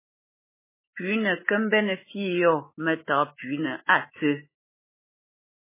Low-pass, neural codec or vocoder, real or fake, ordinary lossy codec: 3.6 kHz; autoencoder, 48 kHz, 128 numbers a frame, DAC-VAE, trained on Japanese speech; fake; MP3, 16 kbps